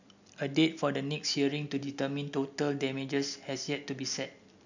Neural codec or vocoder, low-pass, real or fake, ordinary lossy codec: none; 7.2 kHz; real; none